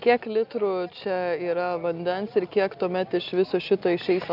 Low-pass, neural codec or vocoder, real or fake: 5.4 kHz; none; real